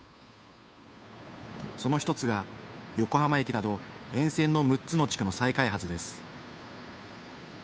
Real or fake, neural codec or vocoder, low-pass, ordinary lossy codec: fake; codec, 16 kHz, 2 kbps, FunCodec, trained on Chinese and English, 25 frames a second; none; none